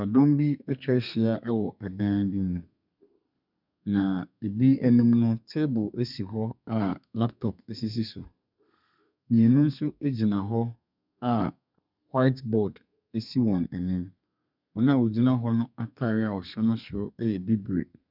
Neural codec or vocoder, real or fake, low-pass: codec, 32 kHz, 1.9 kbps, SNAC; fake; 5.4 kHz